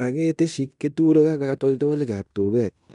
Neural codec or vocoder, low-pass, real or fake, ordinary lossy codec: codec, 16 kHz in and 24 kHz out, 0.9 kbps, LongCat-Audio-Codec, fine tuned four codebook decoder; 10.8 kHz; fake; MP3, 96 kbps